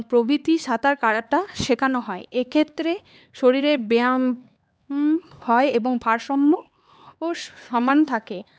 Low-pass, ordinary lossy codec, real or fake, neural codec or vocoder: none; none; fake; codec, 16 kHz, 4 kbps, X-Codec, HuBERT features, trained on LibriSpeech